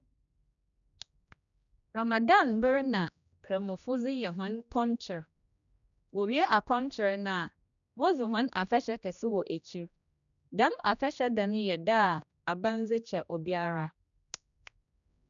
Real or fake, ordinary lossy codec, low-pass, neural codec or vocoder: fake; none; 7.2 kHz; codec, 16 kHz, 1 kbps, X-Codec, HuBERT features, trained on general audio